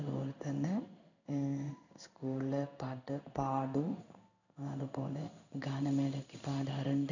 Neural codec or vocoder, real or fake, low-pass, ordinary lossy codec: codec, 16 kHz in and 24 kHz out, 1 kbps, XY-Tokenizer; fake; 7.2 kHz; none